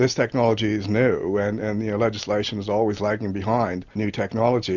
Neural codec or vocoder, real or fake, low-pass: none; real; 7.2 kHz